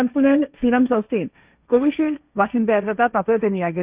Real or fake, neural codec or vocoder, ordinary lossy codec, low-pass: fake; codec, 16 kHz, 1.1 kbps, Voila-Tokenizer; Opus, 64 kbps; 3.6 kHz